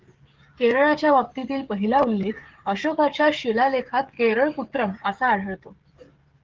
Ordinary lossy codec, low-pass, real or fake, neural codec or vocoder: Opus, 16 kbps; 7.2 kHz; fake; codec, 16 kHz, 16 kbps, FreqCodec, smaller model